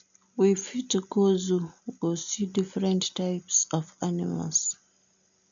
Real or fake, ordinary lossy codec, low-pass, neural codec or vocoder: real; none; 7.2 kHz; none